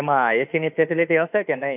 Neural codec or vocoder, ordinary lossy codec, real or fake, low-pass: autoencoder, 48 kHz, 32 numbers a frame, DAC-VAE, trained on Japanese speech; none; fake; 3.6 kHz